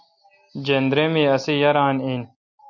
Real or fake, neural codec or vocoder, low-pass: real; none; 7.2 kHz